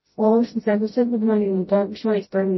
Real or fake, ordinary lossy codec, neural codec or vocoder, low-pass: fake; MP3, 24 kbps; codec, 16 kHz, 0.5 kbps, FreqCodec, smaller model; 7.2 kHz